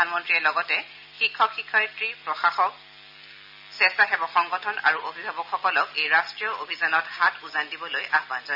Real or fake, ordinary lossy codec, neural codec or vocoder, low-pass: real; AAC, 48 kbps; none; 5.4 kHz